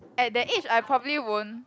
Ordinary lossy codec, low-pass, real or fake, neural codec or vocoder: none; none; real; none